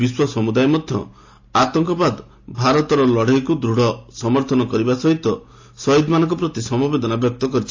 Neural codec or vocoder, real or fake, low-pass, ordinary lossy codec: none; real; 7.2 kHz; AAC, 48 kbps